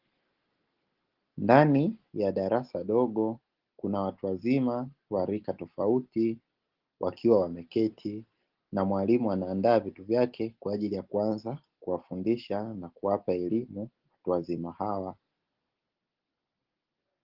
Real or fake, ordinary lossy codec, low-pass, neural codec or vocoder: real; Opus, 16 kbps; 5.4 kHz; none